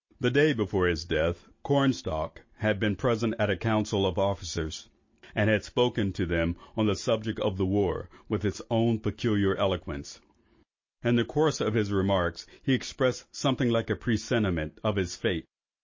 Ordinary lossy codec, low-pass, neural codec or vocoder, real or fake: MP3, 32 kbps; 7.2 kHz; none; real